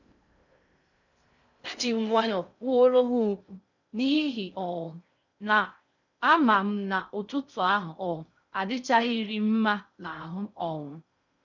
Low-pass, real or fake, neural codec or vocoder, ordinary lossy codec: 7.2 kHz; fake; codec, 16 kHz in and 24 kHz out, 0.6 kbps, FocalCodec, streaming, 2048 codes; none